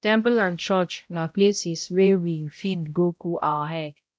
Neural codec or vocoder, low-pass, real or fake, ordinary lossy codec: codec, 16 kHz, 0.5 kbps, X-Codec, HuBERT features, trained on balanced general audio; none; fake; none